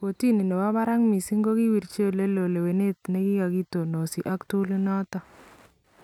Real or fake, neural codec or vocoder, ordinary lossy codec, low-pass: real; none; none; 19.8 kHz